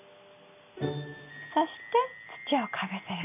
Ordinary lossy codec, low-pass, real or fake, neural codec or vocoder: none; 3.6 kHz; real; none